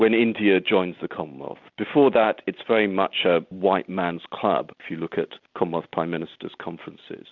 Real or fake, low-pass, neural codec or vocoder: real; 7.2 kHz; none